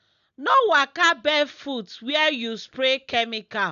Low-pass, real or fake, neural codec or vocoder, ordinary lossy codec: 7.2 kHz; real; none; none